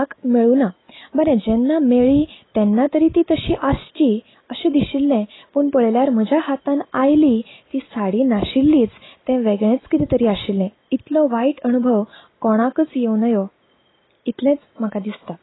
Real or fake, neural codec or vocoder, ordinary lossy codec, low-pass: real; none; AAC, 16 kbps; 7.2 kHz